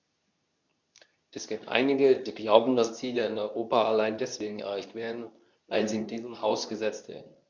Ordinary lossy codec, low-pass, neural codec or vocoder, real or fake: none; 7.2 kHz; codec, 24 kHz, 0.9 kbps, WavTokenizer, medium speech release version 2; fake